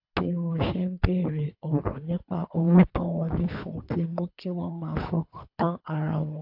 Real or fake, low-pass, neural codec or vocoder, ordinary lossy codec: fake; 5.4 kHz; codec, 24 kHz, 3 kbps, HILCodec; AAC, 48 kbps